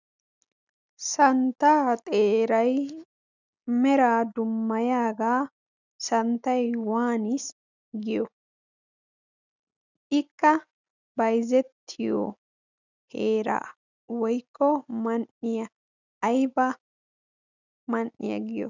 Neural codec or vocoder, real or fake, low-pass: none; real; 7.2 kHz